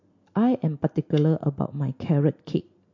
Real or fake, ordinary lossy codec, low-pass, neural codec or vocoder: real; MP3, 48 kbps; 7.2 kHz; none